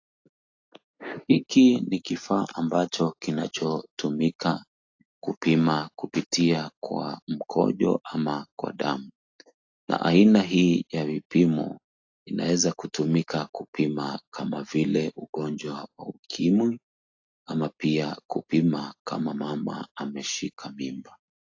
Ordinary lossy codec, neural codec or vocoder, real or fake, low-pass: AAC, 48 kbps; none; real; 7.2 kHz